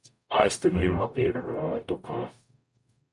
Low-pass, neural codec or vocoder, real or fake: 10.8 kHz; codec, 44.1 kHz, 0.9 kbps, DAC; fake